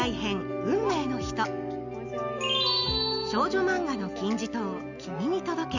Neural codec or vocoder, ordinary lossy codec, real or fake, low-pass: none; none; real; 7.2 kHz